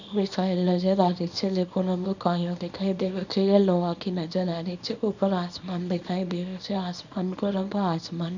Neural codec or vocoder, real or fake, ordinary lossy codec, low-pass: codec, 24 kHz, 0.9 kbps, WavTokenizer, small release; fake; none; 7.2 kHz